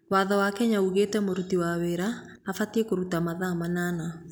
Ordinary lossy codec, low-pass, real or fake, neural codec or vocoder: none; none; real; none